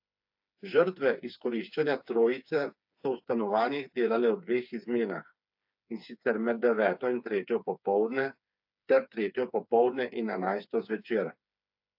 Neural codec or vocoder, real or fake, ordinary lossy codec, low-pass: codec, 16 kHz, 4 kbps, FreqCodec, smaller model; fake; AAC, 48 kbps; 5.4 kHz